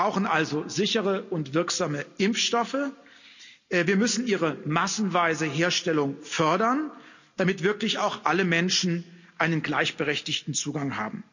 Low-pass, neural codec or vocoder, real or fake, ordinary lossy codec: 7.2 kHz; none; real; none